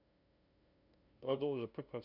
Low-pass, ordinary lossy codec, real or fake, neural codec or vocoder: 5.4 kHz; none; fake; codec, 16 kHz, 0.5 kbps, FunCodec, trained on LibriTTS, 25 frames a second